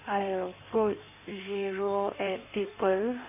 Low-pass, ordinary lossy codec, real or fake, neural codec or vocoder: 3.6 kHz; none; fake; codec, 16 kHz in and 24 kHz out, 1.1 kbps, FireRedTTS-2 codec